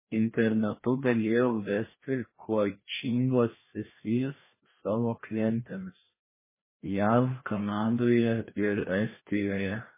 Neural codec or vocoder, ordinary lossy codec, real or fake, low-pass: codec, 16 kHz, 1 kbps, FreqCodec, larger model; MP3, 16 kbps; fake; 3.6 kHz